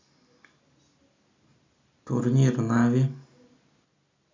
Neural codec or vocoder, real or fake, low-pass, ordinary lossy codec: none; real; 7.2 kHz; MP3, 64 kbps